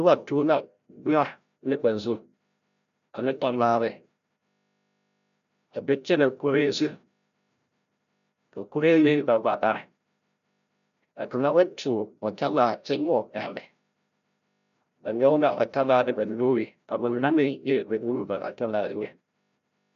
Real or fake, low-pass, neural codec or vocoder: fake; 7.2 kHz; codec, 16 kHz, 0.5 kbps, FreqCodec, larger model